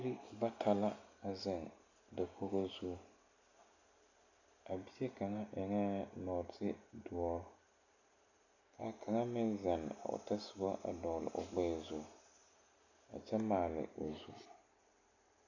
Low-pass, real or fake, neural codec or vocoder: 7.2 kHz; real; none